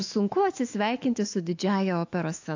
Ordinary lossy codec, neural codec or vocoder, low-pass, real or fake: AAC, 48 kbps; autoencoder, 48 kHz, 128 numbers a frame, DAC-VAE, trained on Japanese speech; 7.2 kHz; fake